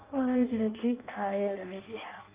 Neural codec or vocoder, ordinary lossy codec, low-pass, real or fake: codec, 16 kHz in and 24 kHz out, 0.6 kbps, FireRedTTS-2 codec; Opus, 24 kbps; 3.6 kHz; fake